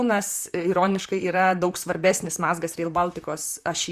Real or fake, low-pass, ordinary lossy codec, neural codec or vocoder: fake; 14.4 kHz; Opus, 64 kbps; vocoder, 44.1 kHz, 128 mel bands, Pupu-Vocoder